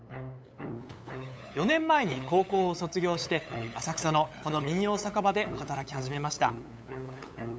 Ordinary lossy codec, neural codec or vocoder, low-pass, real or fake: none; codec, 16 kHz, 8 kbps, FunCodec, trained on LibriTTS, 25 frames a second; none; fake